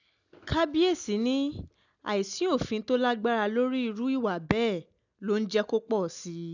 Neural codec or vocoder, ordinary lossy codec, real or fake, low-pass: none; none; real; 7.2 kHz